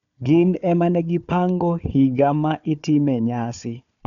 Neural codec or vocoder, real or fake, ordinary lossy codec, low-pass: codec, 16 kHz, 4 kbps, FunCodec, trained on Chinese and English, 50 frames a second; fake; none; 7.2 kHz